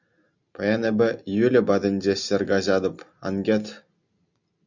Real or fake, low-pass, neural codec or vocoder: real; 7.2 kHz; none